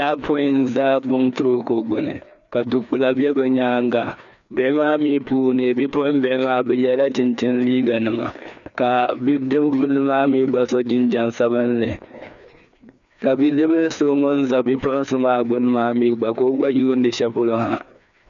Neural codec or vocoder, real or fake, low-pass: codec, 16 kHz, 2 kbps, FreqCodec, larger model; fake; 7.2 kHz